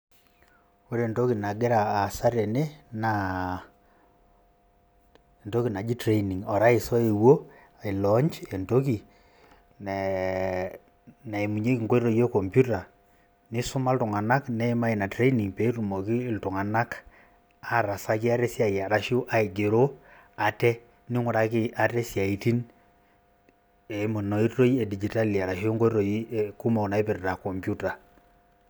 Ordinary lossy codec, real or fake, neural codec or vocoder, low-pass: none; real; none; none